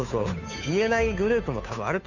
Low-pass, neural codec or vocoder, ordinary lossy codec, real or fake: 7.2 kHz; codec, 16 kHz, 2 kbps, FunCodec, trained on Chinese and English, 25 frames a second; none; fake